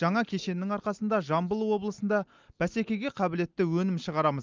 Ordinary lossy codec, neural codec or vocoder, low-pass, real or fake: Opus, 24 kbps; none; 7.2 kHz; real